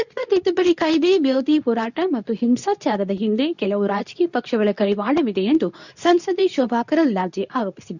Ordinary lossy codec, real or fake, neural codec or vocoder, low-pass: none; fake; codec, 24 kHz, 0.9 kbps, WavTokenizer, medium speech release version 2; 7.2 kHz